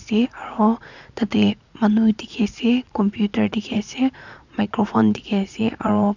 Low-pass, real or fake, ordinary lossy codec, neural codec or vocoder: 7.2 kHz; fake; none; vocoder, 44.1 kHz, 80 mel bands, Vocos